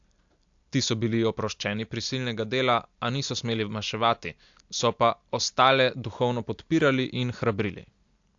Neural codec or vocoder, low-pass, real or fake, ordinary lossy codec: none; 7.2 kHz; real; AAC, 64 kbps